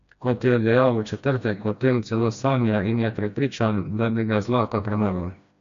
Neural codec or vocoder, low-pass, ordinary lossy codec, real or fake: codec, 16 kHz, 1 kbps, FreqCodec, smaller model; 7.2 kHz; MP3, 64 kbps; fake